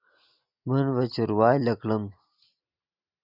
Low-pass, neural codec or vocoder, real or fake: 5.4 kHz; none; real